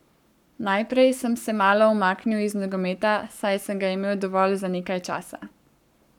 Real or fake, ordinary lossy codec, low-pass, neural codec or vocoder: fake; none; 19.8 kHz; codec, 44.1 kHz, 7.8 kbps, Pupu-Codec